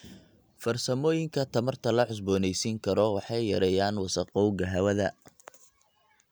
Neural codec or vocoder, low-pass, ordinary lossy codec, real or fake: none; none; none; real